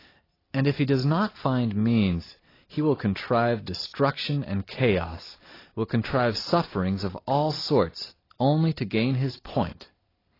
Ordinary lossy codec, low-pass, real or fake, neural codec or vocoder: AAC, 24 kbps; 5.4 kHz; real; none